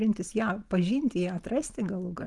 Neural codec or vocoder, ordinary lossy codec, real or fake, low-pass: none; Opus, 32 kbps; real; 10.8 kHz